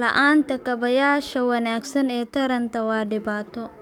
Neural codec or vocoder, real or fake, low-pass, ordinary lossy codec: codec, 44.1 kHz, 7.8 kbps, DAC; fake; 19.8 kHz; none